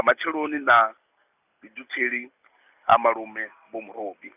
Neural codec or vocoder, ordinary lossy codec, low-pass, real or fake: none; none; 3.6 kHz; real